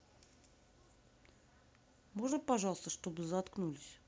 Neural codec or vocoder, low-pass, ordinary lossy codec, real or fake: none; none; none; real